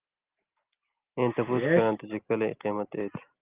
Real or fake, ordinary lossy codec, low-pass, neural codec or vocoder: real; Opus, 32 kbps; 3.6 kHz; none